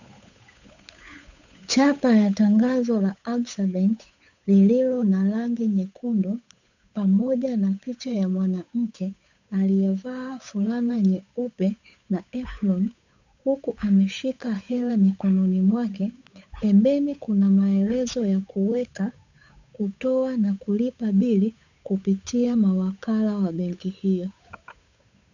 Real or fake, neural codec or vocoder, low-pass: fake; codec, 16 kHz, 8 kbps, FunCodec, trained on Chinese and English, 25 frames a second; 7.2 kHz